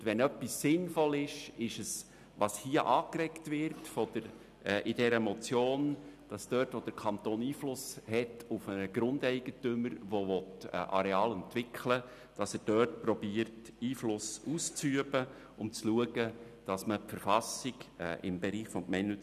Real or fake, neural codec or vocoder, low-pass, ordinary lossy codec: real; none; 14.4 kHz; none